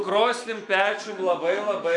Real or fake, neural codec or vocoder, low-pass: fake; autoencoder, 48 kHz, 128 numbers a frame, DAC-VAE, trained on Japanese speech; 10.8 kHz